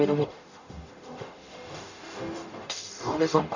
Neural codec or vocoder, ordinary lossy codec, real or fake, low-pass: codec, 44.1 kHz, 0.9 kbps, DAC; none; fake; 7.2 kHz